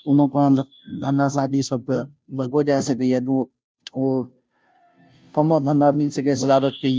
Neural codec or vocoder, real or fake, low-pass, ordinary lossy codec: codec, 16 kHz, 0.5 kbps, FunCodec, trained on Chinese and English, 25 frames a second; fake; none; none